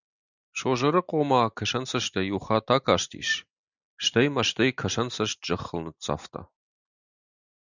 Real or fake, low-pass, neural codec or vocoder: real; 7.2 kHz; none